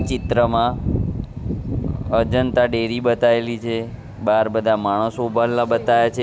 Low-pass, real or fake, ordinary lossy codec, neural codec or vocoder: none; real; none; none